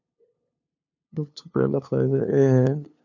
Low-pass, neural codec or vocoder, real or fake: 7.2 kHz; codec, 16 kHz, 2 kbps, FunCodec, trained on LibriTTS, 25 frames a second; fake